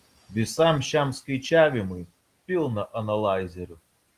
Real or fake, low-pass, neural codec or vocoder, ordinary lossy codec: real; 14.4 kHz; none; Opus, 24 kbps